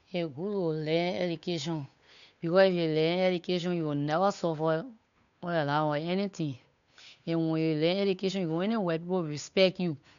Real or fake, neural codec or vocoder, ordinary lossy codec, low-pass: fake; codec, 16 kHz, 8 kbps, FunCodec, trained on Chinese and English, 25 frames a second; none; 7.2 kHz